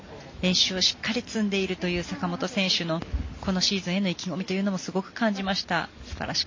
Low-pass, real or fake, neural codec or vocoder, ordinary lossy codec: 7.2 kHz; real; none; MP3, 32 kbps